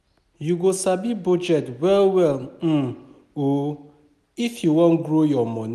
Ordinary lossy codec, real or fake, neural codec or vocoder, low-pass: none; real; none; 14.4 kHz